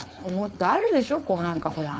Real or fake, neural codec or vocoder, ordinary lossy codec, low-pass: fake; codec, 16 kHz, 4.8 kbps, FACodec; none; none